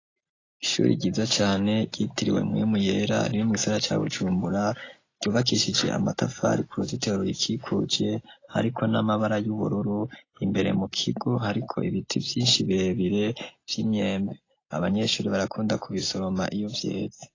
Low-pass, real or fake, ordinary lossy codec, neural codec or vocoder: 7.2 kHz; real; AAC, 32 kbps; none